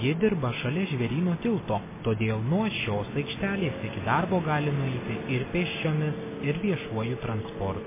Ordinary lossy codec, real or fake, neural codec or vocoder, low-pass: MP3, 16 kbps; real; none; 3.6 kHz